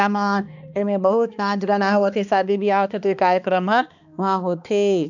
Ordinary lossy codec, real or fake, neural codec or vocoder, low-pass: none; fake; codec, 16 kHz, 2 kbps, X-Codec, HuBERT features, trained on balanced general audio; 7.2 kHz